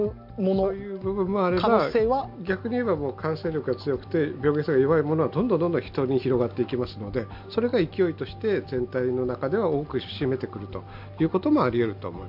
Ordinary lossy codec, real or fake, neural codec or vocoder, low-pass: MP3, 48 kbps; real; none; 5.4 kHz